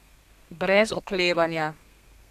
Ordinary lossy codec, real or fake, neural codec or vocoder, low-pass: none; fake; codec, 32 kHz, 1.9 kbps, SNAC; 14.4 kHz